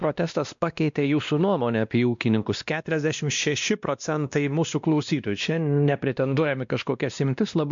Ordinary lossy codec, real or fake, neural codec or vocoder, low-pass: MP3, 64 kbps; fake; codec, 16 kHz, 1 kbps, X-Codec, WavLM features, trained on Multilingual LibriSpeech; 7.2 kHz